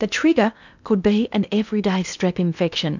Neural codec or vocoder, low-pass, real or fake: codec, 16 kHz in and 24 kHz out, 0.8 kbps, FocalCodec, streaming, 65536 codes; 7.2 kHz; fake